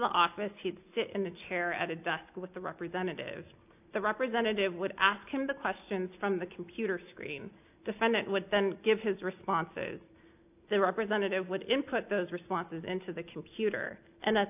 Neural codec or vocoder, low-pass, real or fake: none; 3.6 kHz; real